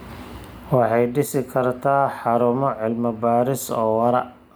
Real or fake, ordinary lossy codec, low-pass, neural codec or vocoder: real; none; none; none